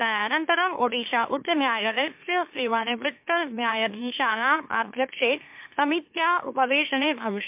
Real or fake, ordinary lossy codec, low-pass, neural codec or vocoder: fake; MP3, 32 kbps; 3.6 kHz; autoencoder, 44.1 kHz, a latent of 192 numbers a frame, MeloTTS